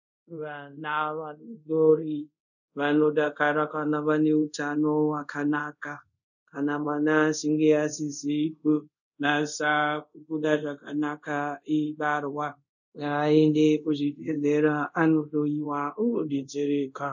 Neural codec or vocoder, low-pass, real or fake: codec, 24 kHz, 0.5 kbps, DualCodec; 7.2 kHz; fake